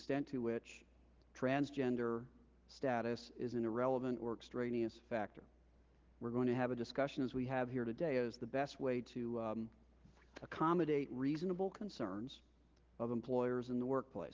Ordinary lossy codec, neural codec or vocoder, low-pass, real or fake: Opus, 16 kbps; none; 7.2 kHz; real